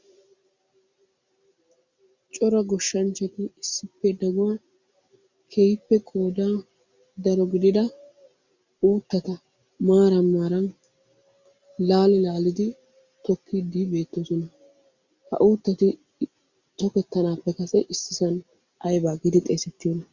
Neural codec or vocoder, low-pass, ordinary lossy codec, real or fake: none; 7.2 kHz; Opus, 64 kbps; real